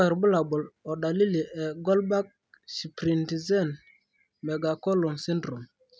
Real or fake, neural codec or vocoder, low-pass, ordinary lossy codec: real; none; none; none